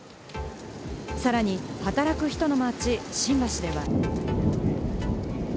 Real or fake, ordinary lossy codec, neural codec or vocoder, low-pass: real; none; none; none